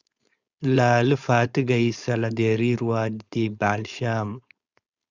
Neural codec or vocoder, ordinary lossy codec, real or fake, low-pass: codec, 16 kHz, 4.8 kbps, FACodec; Opus, 64 kbps; fake; 7.2 kHz